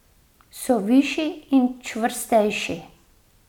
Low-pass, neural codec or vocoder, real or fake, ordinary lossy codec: 19.8 kHz; none; real; none